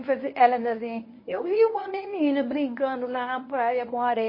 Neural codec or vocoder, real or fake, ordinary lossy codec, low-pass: codec, 24 kHz, 0.9 kbps, WavTokenizer, medium speech release version 1; fake; MP3, 24 kbps; 5.4 kHz